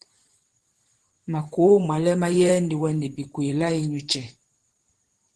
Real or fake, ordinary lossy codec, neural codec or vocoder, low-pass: fake; Opus, 16 kbps; vocoder, 44.1 kHz, 128 mel bands every 512 samples, BigVGAN v2; 10.8 kHz